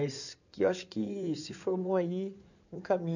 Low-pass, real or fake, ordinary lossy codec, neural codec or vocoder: 7.2 kHz; fake; none; codec, 16 kHz in and 24 kHz out, 2.2 kbps, FireRedTTS-2 codec